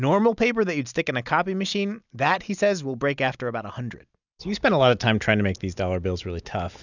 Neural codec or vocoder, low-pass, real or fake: none; 7.2 kHz; real